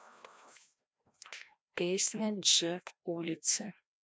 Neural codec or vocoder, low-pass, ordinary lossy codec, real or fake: codec, 16 kHz, 1 kbps, FreqCodec, larger model; none; none; fake